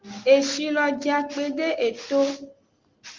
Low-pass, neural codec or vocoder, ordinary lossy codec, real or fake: 7.2 kHz; none; Opus, 24 kbps; real